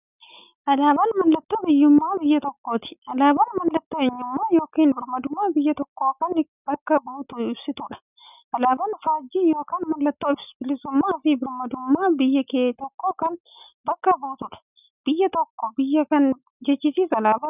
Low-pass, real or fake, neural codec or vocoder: 3.6 kHz; real; none